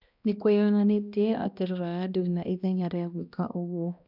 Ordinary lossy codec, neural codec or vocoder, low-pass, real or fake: none; codec, 16 kHz, 1 kbps, X-Codec, HuBERT features, trained on balanced general audio; 5.4 kHz; fake